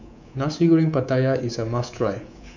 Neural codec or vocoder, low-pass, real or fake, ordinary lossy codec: codec, 24 kHz, 3.1 kbps, DualCodec; 7.2 kHz; fake; none